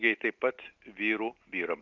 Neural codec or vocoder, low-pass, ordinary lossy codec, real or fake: none; 7.2 kHz; Opus, 32 kbps; real